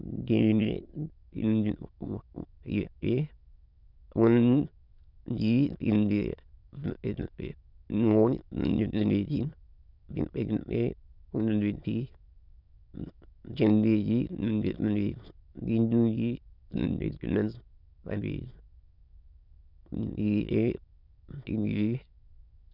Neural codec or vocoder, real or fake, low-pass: autoencoder, 22.05 kHz, a latent of 192 numbers a frame, VITS, trained on many speakers; fake; 5.4 kHz